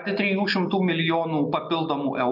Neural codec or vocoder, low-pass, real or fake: none; 5.4 kHz; real